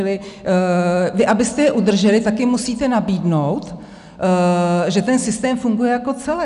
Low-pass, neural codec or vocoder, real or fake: 10.8 kHz; none; real